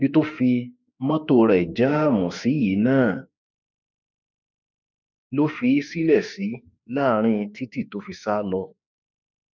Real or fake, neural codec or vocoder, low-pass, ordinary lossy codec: fake; autoencoder, 48 kHz, 32 numbers a frame, DAC-VAE, trained on Japanese speech; 7.2 kHz; none